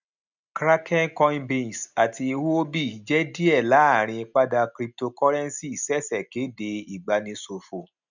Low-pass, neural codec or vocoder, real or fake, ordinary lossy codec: 7.2 kHz; none; real; none